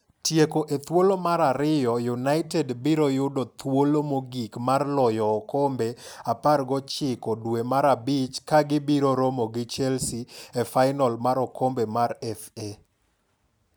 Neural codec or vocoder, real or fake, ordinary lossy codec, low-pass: none; real; none; none